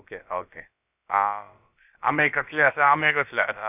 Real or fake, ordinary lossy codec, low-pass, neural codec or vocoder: fake; none; 3.6 kHz; codec, 16 kHz, about 1 kbps, DyCAST, with the encoder's durations